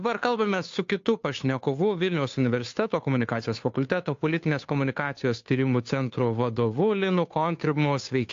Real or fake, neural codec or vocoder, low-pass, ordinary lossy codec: fake; codec, 16 kHz, 2 kbps, FunCodec, trained on Chinese and English, 25 frames a second; 7.2 kHz; AAC, 48 kbps